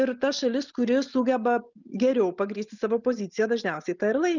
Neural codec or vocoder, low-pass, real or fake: none; 7.2 kHz; real